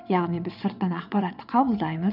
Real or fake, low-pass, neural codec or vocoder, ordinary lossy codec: fake; 5.4 kHz; vocoder, 22.05 kHz, 80 mel bands, WaveNeXt; none